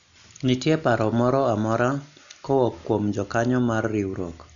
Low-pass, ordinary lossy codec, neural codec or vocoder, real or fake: 7.2 kHz; none; none; real